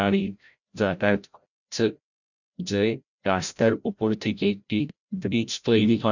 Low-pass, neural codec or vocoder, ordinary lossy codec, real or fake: 7.2 kHz; codec, 16 kHz, 0.5 kbps, FreqCodec, larger model; none; fake